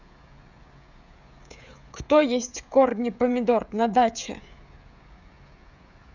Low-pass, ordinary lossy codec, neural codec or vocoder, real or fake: 7.2 kHz; none; codec, 16 kHz, 16 kbps, FreqCodec, smaller model; fake